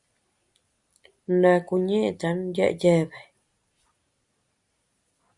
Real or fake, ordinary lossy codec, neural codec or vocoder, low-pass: real; Opus, 64 kbps; none; 10.8 kHz